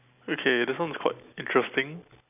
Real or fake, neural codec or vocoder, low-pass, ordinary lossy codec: real; none; 3.6 kHz; none